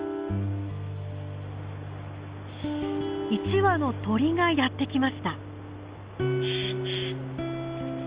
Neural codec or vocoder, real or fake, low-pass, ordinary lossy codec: none; real; 3.6 kHz; Opus, 32 kbps